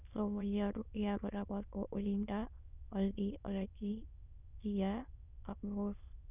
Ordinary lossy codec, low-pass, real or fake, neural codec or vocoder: none; 3.6 kHz; fake; autoencoder, 22.05 kHz, a latent of 192 numbers a frame, VITS, trained on many speakers